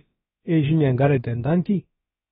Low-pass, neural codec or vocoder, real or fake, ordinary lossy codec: 7.2 kHz; codec, 16 kHz, about 1 kbps, DyCAST, with the encoder's durations; fake; AAC, 16 kbps